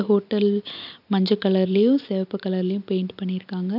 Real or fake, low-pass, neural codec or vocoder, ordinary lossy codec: real; 5.4 kHz; none; none